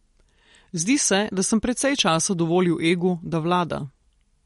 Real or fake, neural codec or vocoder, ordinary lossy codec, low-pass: real; none; MP3, 48 kbps; 19.8 kHz